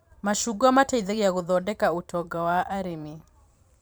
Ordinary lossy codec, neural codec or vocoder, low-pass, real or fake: none; vocoder, 44.1 kHz, 128 mel bands every 512 samples, BigVGAN v2; none; fake